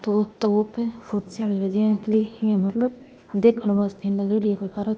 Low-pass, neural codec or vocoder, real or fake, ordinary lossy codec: none; codec, 16 kHz, 0.8 kbps, ZipCodec; fake; none